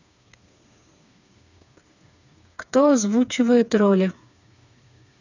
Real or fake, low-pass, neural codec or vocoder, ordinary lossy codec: fake; 7.2 kHz; codec, 16 kHz, 4 kbps, FreqCodec, smaller model; none